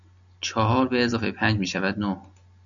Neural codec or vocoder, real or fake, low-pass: none; real; 7.2 kHz